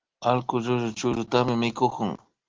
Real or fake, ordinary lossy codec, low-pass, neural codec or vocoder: real; Opus, 16 kbps; 7.2 kHz; none